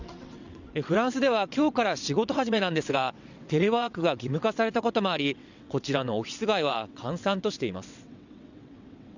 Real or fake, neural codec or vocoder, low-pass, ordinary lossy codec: fake; vocoder, 22.05 kHz, 80 mel bands, WaveNeXt; 7.2 kHz; none